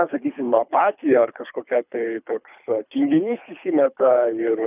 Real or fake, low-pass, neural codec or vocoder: fake; 3.6 kHz; codec, 24 kHz, 3 kbps, HILCodec